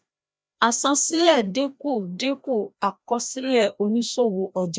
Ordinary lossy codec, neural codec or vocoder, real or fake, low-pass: none; codec, 16 kHz, 1 kbps, FreqCodec, larger model; fake; none